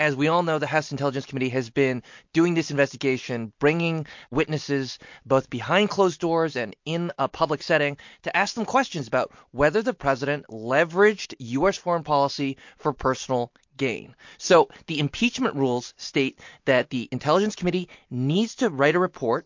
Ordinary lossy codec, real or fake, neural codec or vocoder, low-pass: MP3, 48 kbps; real; none; 7.2 kHz